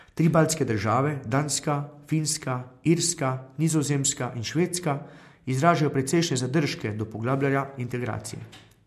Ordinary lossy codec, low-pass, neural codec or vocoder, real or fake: MP3, 64 kbps; 14.4 kHz; none; real